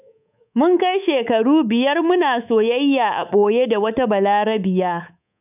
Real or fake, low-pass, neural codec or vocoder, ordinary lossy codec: fake; 3.6 kHz; codec, 24 kHz, 3.1 kbps, DualCodec; none